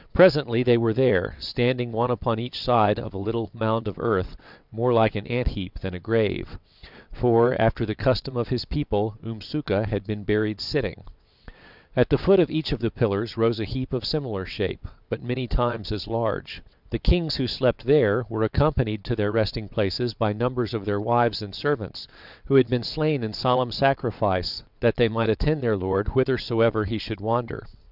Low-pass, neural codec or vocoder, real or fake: 5.4 kHz; vocoder, 22.05 kHz, 80 mel bands, WaveNeXt; fake